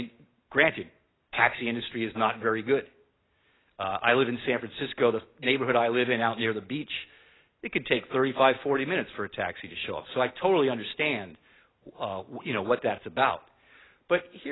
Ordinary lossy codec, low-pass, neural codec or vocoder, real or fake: AAC, 16 kbps; 7.2 kHz; none; real